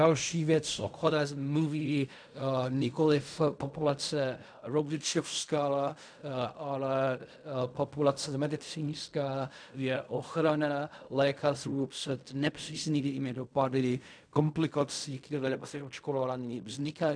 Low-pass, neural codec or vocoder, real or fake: 9.9 kHz; codec, 16 kHz in and 24 kHz out, 0.4 kbps, LongCat-Audio-Codec, fine tuned four codebook decoder; fake